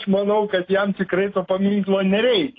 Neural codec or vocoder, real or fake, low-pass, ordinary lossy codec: none; real; 7.2 kHz; AAC, 32 kbps